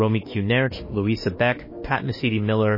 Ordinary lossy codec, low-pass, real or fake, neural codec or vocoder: MP3, 24 kbps; 5.4 kHz; fake; autoencoder, 48 kHz, 32 numbers a frame, DAC-VAE, trained on Japanese speech